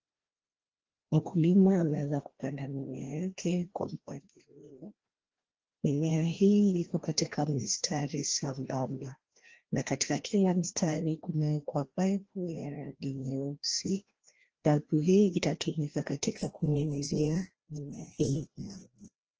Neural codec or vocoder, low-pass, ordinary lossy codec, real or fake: codec, 16 kHz, 1 kbps, FreqCodec, larger model; 7.2 kHz; Opus, 16 kbps; fake